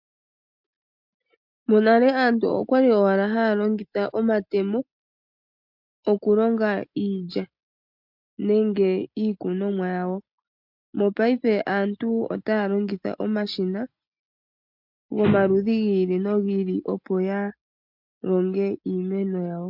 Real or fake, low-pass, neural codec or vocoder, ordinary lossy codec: real; 5.4 kHz; none; MP3, 48 kbps